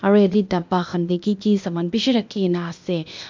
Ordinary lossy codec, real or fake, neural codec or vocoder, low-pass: MP3, 64 kbps; fake; codec, 16 kHz, 0.8 kbps, ZipCodec; 7.2 kHz